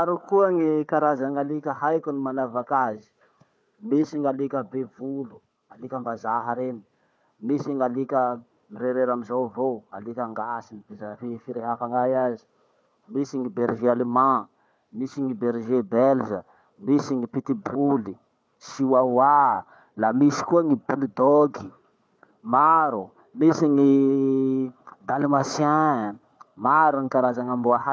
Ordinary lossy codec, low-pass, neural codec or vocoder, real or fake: none; none; codec, 16 kHz, 4 kbps, FunCodec, trained on Chinese and English, 50 frames a second; fake